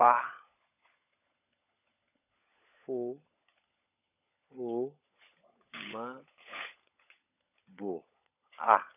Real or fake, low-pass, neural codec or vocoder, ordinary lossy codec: fake; 3.6 kHz; codec, 16 kHz, 16 kbps, FunCodec, trained on LibriTTS, 50 frames a second; none